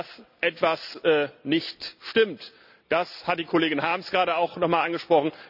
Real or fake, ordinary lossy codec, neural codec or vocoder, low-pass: real; none; none; 5.4 kHz